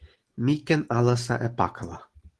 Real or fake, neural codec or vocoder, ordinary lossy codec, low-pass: real; none; Opus, 16 kbps; 10.8 kHz